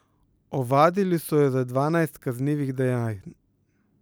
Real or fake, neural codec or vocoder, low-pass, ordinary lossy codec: real; none; none; none